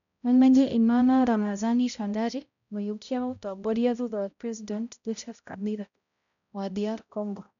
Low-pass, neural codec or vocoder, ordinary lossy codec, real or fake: 7.2 kHz; codec, 16 kHz, 0.5 kbps, X-Codec, HuBERT features, trained on balanced general audio; none; fake